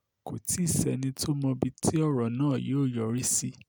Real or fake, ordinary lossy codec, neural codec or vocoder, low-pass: real; none; none; none